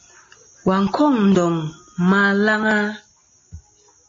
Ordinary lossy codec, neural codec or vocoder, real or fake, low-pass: MP3, 32 kbps; none; real; 7.2 kHz